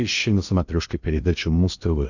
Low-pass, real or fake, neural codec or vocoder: 7.2 kHz; fake; codec, 16 kHz in and 24 kHz out, 0.8 kbps, FocalCodec, streaming, 65536 codes